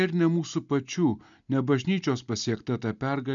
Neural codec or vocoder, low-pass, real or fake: none; 7.2 kHz; real